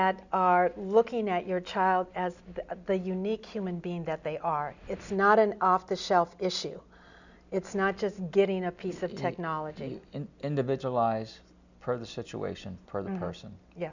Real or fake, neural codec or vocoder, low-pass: real; none; 7.2 kHz